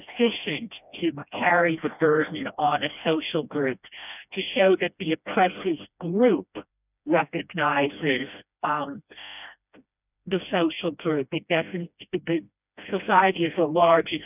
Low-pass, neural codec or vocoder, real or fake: 3.6 kHz; codec, 16 kHz, 1 kbps, FreqCodec, smaller model; fake